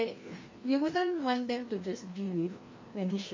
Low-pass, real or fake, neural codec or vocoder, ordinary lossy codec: 7.2 kHz; fake; codec, 16 kHz, 1 kbps, FreqCodec, larger model; MP3, 32 kbps